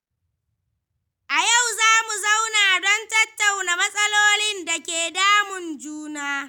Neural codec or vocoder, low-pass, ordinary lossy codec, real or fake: none; none; none; real